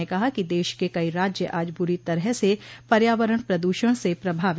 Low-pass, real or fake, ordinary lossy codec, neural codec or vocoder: none; real; none; none